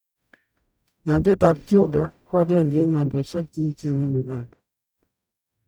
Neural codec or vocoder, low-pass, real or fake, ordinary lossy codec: codec, 44.1 kHz, 0.9 kbps, DAC; none; fake; none